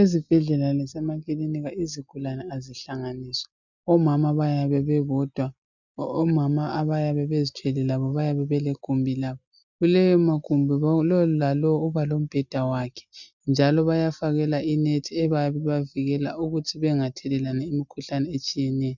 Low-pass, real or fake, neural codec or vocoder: 7.2 kHz; real; none